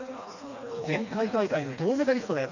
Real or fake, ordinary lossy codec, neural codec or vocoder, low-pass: fake; none; codec, 16 kHz, 2 kbps, FreqCodec, smaller model; 7.2 kHz